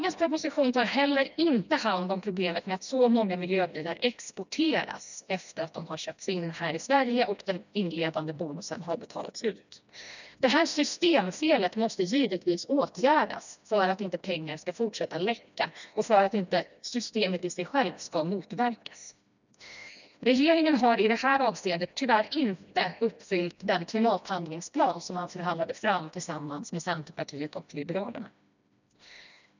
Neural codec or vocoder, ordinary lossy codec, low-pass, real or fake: codec, 16 kHz, 1 kbps, FreqCodec, smaller model; none; 7.2 kHz; fake